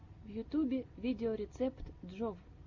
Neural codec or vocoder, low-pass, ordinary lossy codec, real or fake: none; 7.2 kHz; MP3, 48 kbps; real